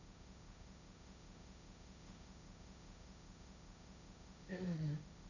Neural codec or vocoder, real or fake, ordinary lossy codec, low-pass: codec, 16 kHz, 1.1 kbps, Voila-Tokenizer; fake; none; 7.2 kHz